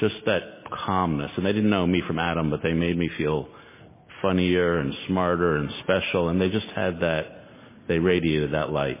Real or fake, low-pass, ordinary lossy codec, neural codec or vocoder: real; 3.6 kHz; MP3, 16 kbps; none